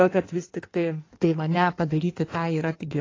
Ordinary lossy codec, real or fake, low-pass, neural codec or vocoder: AAC, 32 kbps; fake; 7.2 kHz; codec, 16 kHz in and 24 kHz out, 1.1 kbps, FireRedTTS-2 codec